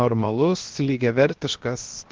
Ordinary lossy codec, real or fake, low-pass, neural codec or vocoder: Opus, 16 kbps; fake; 7.2 kHz; codec, 16 kHz, 0.7 kbps, FocalCodec